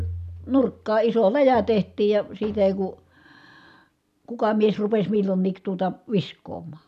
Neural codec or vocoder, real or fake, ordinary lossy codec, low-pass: none; real; none; 14.4 kHz